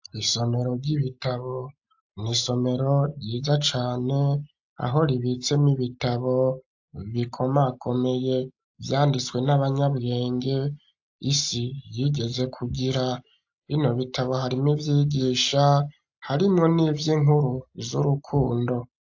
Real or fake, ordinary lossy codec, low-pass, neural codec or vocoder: real; AAC, 48 kbps; 7.2 kHz; none